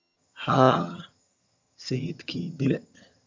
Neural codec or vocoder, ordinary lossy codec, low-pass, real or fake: vocoder, 22.05 kHz, 80 mel bands, HiFi-GAN; MP3, 64 kbps; 7.2 kHz; fake